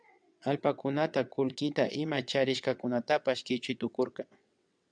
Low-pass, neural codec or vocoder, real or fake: 9.9 kHz; vocoder, 22.05 kHz, 80 mel bands, WaveNeXt; fake